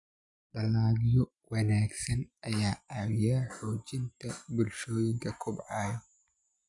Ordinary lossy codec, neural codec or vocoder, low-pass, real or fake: none; none; 10.8 kHz; real